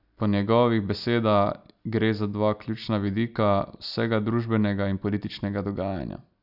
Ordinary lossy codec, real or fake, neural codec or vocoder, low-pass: none; real; none; 5.4 kHz